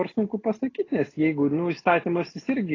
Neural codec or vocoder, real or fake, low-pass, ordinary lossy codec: none; real; 7.2 kHz; AAC, 32 kbps